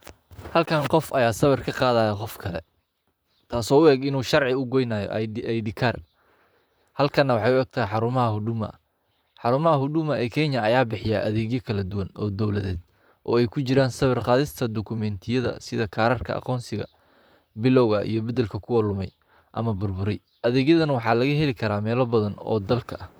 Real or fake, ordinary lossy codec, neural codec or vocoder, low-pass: fake; none; vocoder, 44.1 kHz, 128 mel bands, Pupu-Vocoder; none